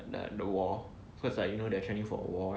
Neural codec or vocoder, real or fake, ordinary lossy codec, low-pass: none; real; none; none